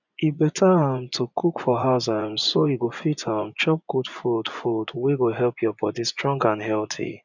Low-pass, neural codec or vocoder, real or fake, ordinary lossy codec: 7.2 kHz; vocoder, 24 kHz, 100 mel bands, Vocos; fake; none